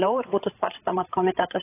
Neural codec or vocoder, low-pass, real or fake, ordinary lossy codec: codec, 16 kHz, 16 kbps, FreqCodec, larger model; 3.6 kHz; fake; AAC, 16 kbps